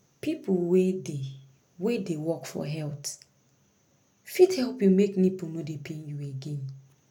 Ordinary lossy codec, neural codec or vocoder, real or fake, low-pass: none; none; real; 19.8 kHz